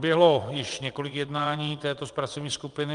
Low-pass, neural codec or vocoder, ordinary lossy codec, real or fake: 9.9 kHz; vocoder, 22.05 kHz, 80 mel bands, WaveNeXt; Opus, 32 kbps; fake